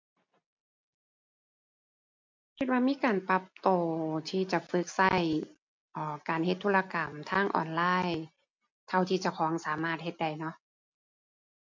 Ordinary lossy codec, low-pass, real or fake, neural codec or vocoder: MP3, 48 kbps; 7.2 kHz; real; none